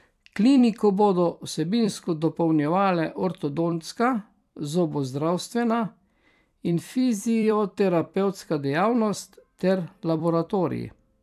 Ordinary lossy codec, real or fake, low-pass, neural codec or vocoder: none; fake; 14.4 kHz; vocoder, 44.1 kHz, 128 mel bands every 256 samples, BigVGAN v2